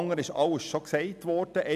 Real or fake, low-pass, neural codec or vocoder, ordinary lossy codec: real; 14.4 kHz; none; none